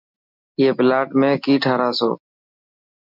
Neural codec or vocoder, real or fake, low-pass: none; real; 5.4 kHz